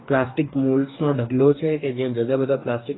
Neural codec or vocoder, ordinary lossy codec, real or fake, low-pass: codec, 44.1 kHz, 2.6 kbps, DAC; AAC, 16 kbps; fake; 7.2 kHz